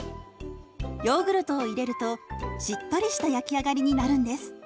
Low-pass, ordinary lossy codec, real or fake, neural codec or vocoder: none; none; real; none